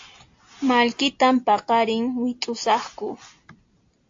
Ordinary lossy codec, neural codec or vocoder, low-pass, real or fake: AAC, 48 kbps; none; 7.2 kHz; real